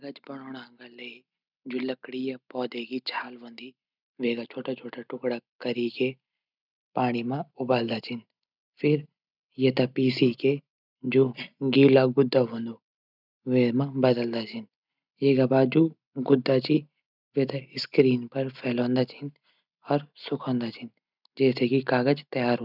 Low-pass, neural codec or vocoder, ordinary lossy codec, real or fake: 5.4 kHz; none; none; real